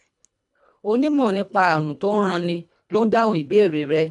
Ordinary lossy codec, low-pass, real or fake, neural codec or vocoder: none; 10.8 kHz; fake; codec, 24 kHz, 1.5 kbps, HILCodec